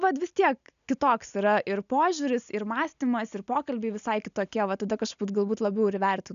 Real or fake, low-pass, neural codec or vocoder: real; 7.2 kHz; none